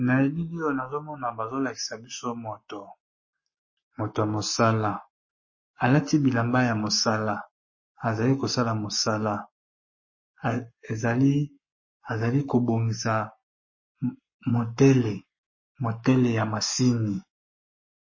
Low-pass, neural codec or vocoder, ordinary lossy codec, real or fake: 7.2 kHz; codec, 44.1 kHz, 7.8 kbps, Pupu-Codec; MP3, 32 kbps; fake